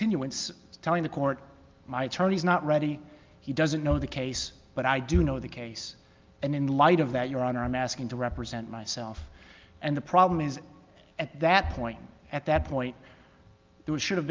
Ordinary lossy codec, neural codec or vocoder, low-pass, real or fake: Opus, 24 kbps; none; 7.2 kHz; real